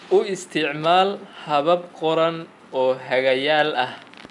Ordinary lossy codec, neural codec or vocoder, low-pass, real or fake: none; none; 10.8 kHz; real